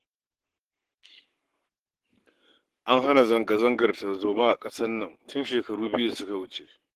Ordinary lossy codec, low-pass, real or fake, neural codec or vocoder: Opus, 16 kbps; 14.4 kHz; fake; codec, 44.1 kHz, 7.8 kbps, Pupu-Codec